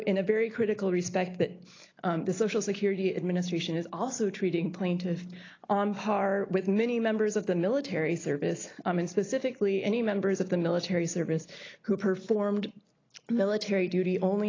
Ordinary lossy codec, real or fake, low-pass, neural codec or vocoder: AAC, 32 kbps; real; 7.2 kHz; none